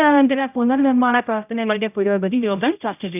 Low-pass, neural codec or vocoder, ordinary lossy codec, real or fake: 3.6 kHz; codec, 16 kHz, 0.5 kbps, X-Codec, HuBERT features, trained on general audio; none; fake